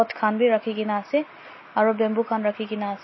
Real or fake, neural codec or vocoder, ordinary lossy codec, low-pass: real; none; MP3, 24 kbps; 7.2 kHz